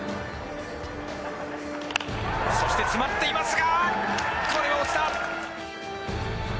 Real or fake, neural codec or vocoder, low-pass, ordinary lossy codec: real; none; none; none